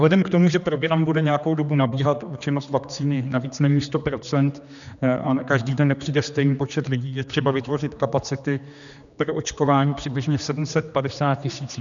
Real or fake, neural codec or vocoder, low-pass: fake; codec, 16 kHz, 2 kbps, X-Codec, HuBERT features, trained on general audio; 7.2 kHz